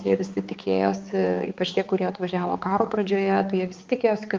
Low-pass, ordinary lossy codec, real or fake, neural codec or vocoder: 7.2 kHz; Opus, 32 kbps; fake; codec, 16 kHz, 4 kbps, X-Codec, HuBERT features, trained on balanced general audio